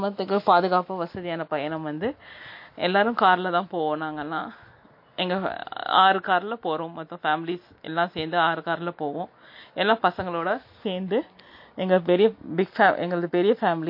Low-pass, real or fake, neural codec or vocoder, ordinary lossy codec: 5.4 kHz; real; none; MP3, 32 kbps